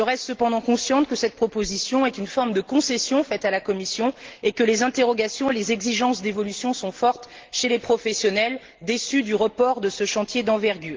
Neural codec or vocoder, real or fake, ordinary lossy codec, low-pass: none; real; Opus, 16 kbps; 7.2 kHz